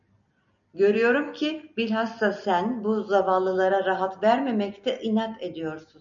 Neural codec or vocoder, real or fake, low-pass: none; real; 7.2 kHz